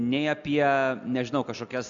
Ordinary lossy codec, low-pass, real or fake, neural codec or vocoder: AAC, 64 kbps; 7.2 kHz; real; none